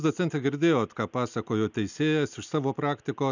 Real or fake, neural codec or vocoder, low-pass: real; none; 7.2 kHz